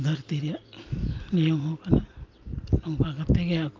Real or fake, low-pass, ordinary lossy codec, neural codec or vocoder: real; 7.2 kHz; Opus, 32 kbps; none